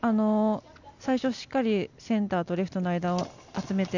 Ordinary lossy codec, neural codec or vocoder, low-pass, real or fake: none; none; 7.2 kHz; real